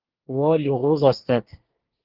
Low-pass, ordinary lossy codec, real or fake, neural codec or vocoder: 5.4 kHz; Opus, 16 kbps; fake; codec, 24 kHz, 1 kbps, SNAC